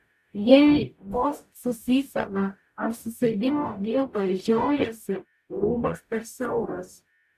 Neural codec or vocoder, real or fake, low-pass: codec, 44.1 kHz, 0.9 kbps, DAC; fake; 14.4 kHz